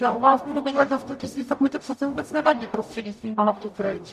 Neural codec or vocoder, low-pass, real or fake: codec, 44.1 kHz, 0.9 kbps, DAC; 14.4 kHz; fake